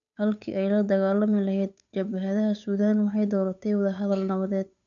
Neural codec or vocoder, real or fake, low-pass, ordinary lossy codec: codec, 16 kHz, 8 kbps, FunCodec, trained on Chinese and English, 25 frames a second; fake; 7.2 kHz; none